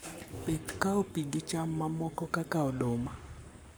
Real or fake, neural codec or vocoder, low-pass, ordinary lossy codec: fake; codec, 44.1 kHz, 7.8 kbps, Pupu-Codec; none; none